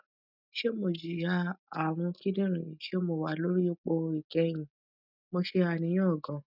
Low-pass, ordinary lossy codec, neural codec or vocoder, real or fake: 5.4 kHz; none; none; real